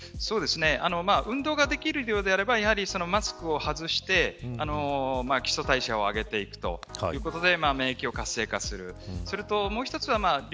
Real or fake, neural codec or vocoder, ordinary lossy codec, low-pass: real; none; none; none